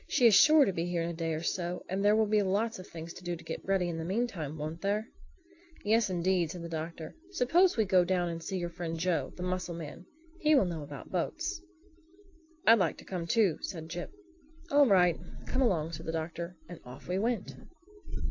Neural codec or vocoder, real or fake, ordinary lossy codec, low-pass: none; real; AAC, 48 kbps; 7.2 kHz